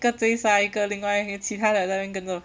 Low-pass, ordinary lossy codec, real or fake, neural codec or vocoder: none; none; real; none